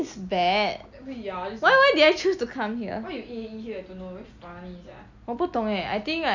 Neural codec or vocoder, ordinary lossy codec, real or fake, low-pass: none; none; real; 7.2 kHz